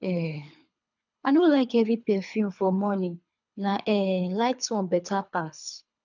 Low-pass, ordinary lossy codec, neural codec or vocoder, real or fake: 7.2 kHz; none; codec, 24 kHz, 3 kbps, HILCodec; fake